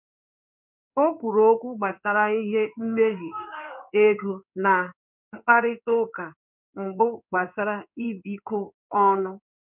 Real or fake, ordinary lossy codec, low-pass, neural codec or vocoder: fake; none; 3.6 kHz; codec, 16 kHz in and 24 kHz out, 1 kbps, XY-Tokenizer